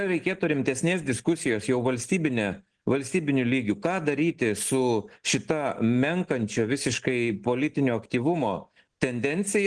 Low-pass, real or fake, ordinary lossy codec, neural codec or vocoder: 9.9 kHz; real; Opus, 16 kbps; none